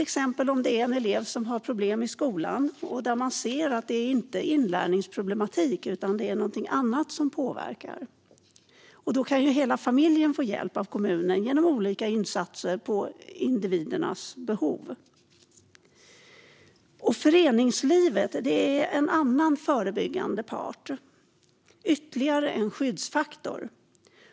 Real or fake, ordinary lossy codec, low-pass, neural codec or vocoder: real; none; none; none